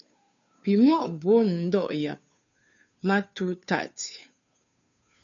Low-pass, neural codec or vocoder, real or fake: 7.2 kHz; codec, 16 kHz, 2 kbps, FunCodec, trained on Chinese and English, 25 frames a second; fake